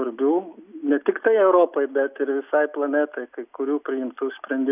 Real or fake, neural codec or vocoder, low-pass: real; none; 3.6 kHz